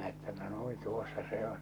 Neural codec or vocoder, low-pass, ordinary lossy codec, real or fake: codec, 44.1 kHz, 7.8 kbps, Pupu-Codec; none; none; fake